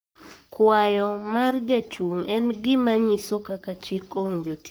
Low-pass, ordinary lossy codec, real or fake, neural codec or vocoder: none; none; fake; codec, 44.1 kHz, 3.4 kbps, Pupu-Codec